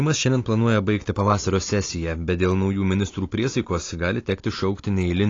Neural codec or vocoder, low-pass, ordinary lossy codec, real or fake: none; 7.2 kHz; AAC, 32 kbps; real